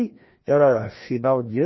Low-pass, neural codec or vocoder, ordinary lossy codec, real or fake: 7.2 kHz; codec, 16 kHz, 1 kbps, FreqCodec, larger model; MP3, 24 kbps; fake